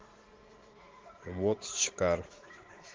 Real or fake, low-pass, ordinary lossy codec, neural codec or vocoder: fake; 7.2 kHz; Opus, 16 kbps; vocoder, 44.1 kHz, 80 mel bands, Vocos